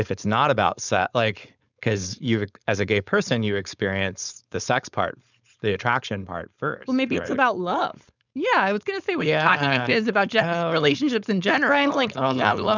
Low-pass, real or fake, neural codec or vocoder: 7.2 kHz; fake; codec, 16 kHz, 4.8 kbps, FACodec